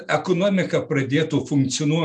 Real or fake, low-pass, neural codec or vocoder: real; 9.9 kHz; none